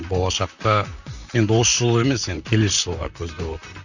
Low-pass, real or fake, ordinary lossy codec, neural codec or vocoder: 7.2 kHz; fake; none; vocoder, 44.1 kHz, 128 mel bands, Pupu-Vocoder